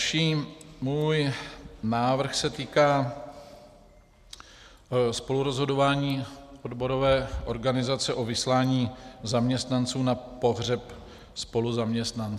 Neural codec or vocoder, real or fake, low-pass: none; real; 14.4 kHz